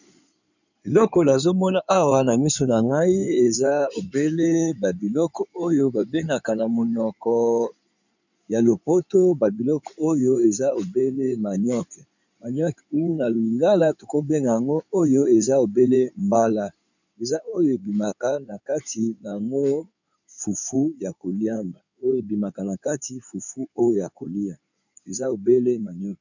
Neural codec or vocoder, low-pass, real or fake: codec, 16 kHz in and 24 kHz out, 2.2 kbps, FireRedTTS-2 codec; 7.2 kHz; fake